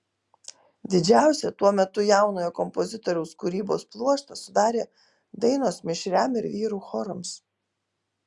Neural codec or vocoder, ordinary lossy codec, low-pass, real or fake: none; Opus, 64 kbps; 10.8 kHz; real